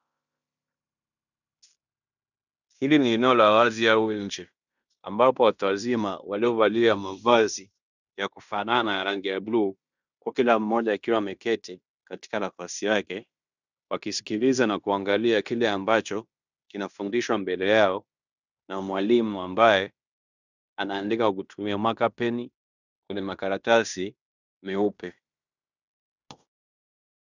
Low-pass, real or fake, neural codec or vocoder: 7.2 kHz; fake; codec, 16 kHz in and 24 kHz out, 0.9 kbps, LongCat-Audio-Codec, fine tuned four codebook decoder